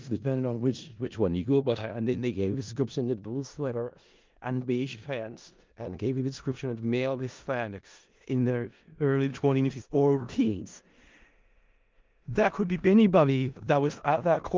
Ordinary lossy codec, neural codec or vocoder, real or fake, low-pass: Opus, 32 kbps; codec, 16 kHz in and 24 kHz out, 0.4 kbps, LongCat-Audio-Codec, four codebook decoder; fake; 7.2 kHz